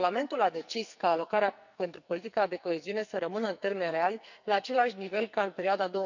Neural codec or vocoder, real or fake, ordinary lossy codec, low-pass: codec, 44.1 kHz, 2.6 kbps, SNAC; fake; none; 7.2 kHz